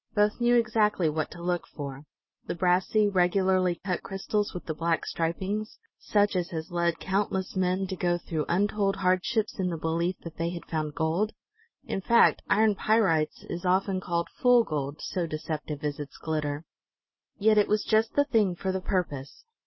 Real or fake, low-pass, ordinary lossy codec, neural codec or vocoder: real; 7.2 kHz; MP3, 24 kbps; none